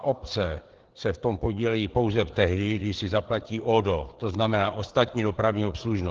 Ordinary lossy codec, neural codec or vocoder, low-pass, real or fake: Opus, 16 kbps; codec, 16 kHz, 4 kbps, FreqCodec, larger model; 7.2 kHz; fake